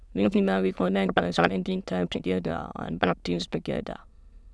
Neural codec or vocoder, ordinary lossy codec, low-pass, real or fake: autoencoder, 22.05 kHz, a latent of 192 numbers a frame, VITS, trained on many speakers; none; none; fake